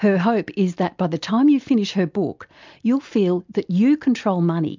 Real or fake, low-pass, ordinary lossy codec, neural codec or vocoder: real; 7.2 kHz; MP3, 64 kbps; none